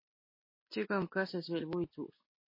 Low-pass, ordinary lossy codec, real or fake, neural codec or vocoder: 5.4 kHz; MP3, 24 kbps; real; none